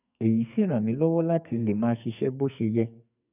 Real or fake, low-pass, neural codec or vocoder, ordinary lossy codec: fake; 3.6 kHz; codec, 44.1 kHz, 2.6 kbps, SNAC; none